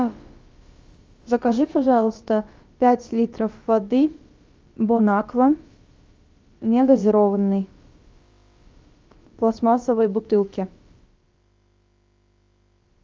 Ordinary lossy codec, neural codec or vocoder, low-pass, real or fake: Opus, 32 kbps; codec, 16 kHz, about 1 kbps, DyCAST, with the encoder's durations; 7.2 kHz; fake